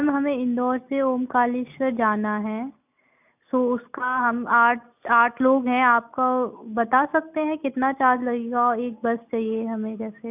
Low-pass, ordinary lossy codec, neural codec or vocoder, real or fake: 3.6 kHz; none; none; real